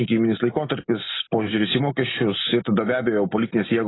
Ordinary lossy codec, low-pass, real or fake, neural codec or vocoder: AAC, 16 kbps; 7.2 kHz; real; none